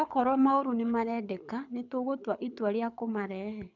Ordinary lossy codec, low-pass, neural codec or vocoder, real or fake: none; 7.2 kHz; codec, 24 kHz, 6 kbps, HILCodec; fake